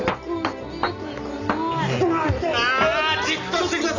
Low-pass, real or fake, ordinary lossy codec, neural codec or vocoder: 7.2 kHz; real; none; none